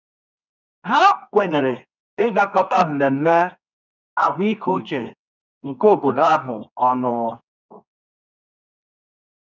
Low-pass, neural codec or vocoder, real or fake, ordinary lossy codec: 7.2 kHz; codec, 24 kHz, 0.9 kbps, WavTokenizer, medium music audio release; fake; none